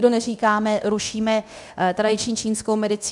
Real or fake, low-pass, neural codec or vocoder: fake; 10.8 kHz; codec, 24 kHz, 0.9 kbps, DualCodec